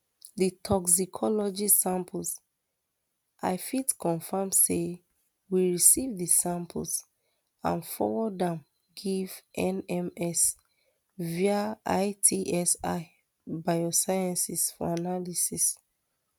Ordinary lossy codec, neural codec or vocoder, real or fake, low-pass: none; none; real; none